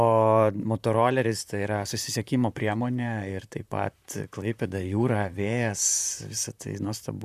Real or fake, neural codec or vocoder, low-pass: fake; vocoder, 44.1 kHz, 128 mel bands, Pupu-Vocoder; 14.4 kHz